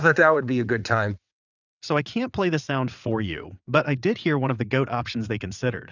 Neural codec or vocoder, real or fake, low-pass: vocoder, 22.05 kHz, 80 mel bands, WaveNeXt; fake; 7.2 kHz